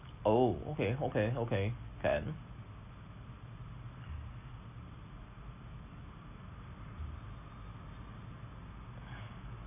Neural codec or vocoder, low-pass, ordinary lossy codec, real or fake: none; 3.6 kHz; none; real